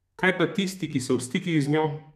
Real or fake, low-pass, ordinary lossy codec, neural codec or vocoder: fake; 14.4 kHz; none; codec, 32 kHz, 1.9 kbps, SNAC